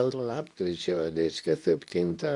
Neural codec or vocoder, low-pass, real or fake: codec, 24 kHz, 0.9 kbps, WavTokenizer, medium speech release version 2; 10.8 kHz; fake